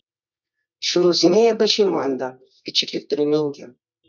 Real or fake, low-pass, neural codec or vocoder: fake; 7.2 kHz; codec, 24 kHz, 0.9 kbps, WavTokenizer, medium music audio release